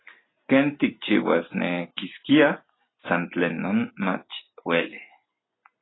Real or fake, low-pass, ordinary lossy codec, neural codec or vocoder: real; 7.2 kHz; AAC, 16 kbps; none